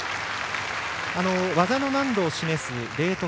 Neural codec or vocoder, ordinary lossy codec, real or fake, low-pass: none; none; real; none